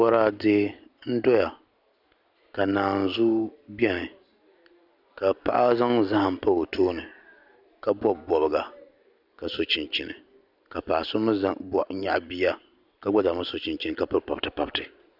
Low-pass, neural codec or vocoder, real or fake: 5.4 kHz; none; real